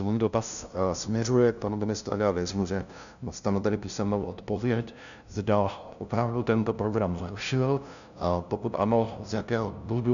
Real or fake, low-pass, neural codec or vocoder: fake; 7.2 kHz; codec, 16 kHz, 0.5 kbps, FunCodec, trained on LibriTTS, 25 frames a second